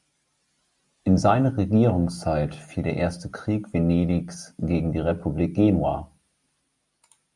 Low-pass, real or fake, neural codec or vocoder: 10.8 kHz; real; none